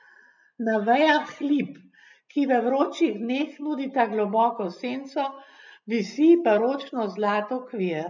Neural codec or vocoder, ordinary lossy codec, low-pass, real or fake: none; none; 7.2 kHz; real